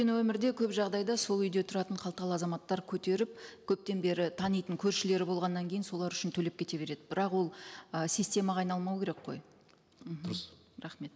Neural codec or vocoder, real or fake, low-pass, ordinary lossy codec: none; real; none; none